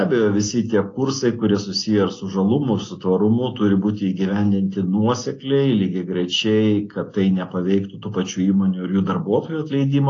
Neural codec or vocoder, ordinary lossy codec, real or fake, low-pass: none; AAC, 32 kbps; real; 7.2 kHz